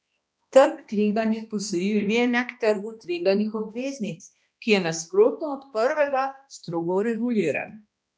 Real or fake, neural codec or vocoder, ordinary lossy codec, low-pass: fake; codec, 16 kHz, 1 kbps, X-Codec, HuBERT features, trained on balanced general audio; none; none